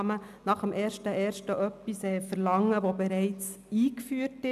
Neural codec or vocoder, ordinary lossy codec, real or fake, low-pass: none; none; real; 14.4 kHz